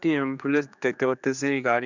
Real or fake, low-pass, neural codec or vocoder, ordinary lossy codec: fake; 7.2 kHz; codec, 16 kHz, 2 kbps, X-Codec, HuBERT features, trained on general audio; none